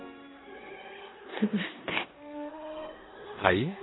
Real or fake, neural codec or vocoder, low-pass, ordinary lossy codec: fake; codec, 16 kHz in and 24 kHz out, 0.9 kbps, LongCat-Audio-Codec, four codebook decoder; 7.2 kHz; AAC, 16 kbps